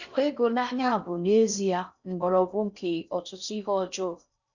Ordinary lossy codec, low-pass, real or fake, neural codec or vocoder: none; 7.2 kHz; fake; codec, 16 kHz in and 24 kHz out, 0.6 kbps, FocalCodec, streaming, 4096 codes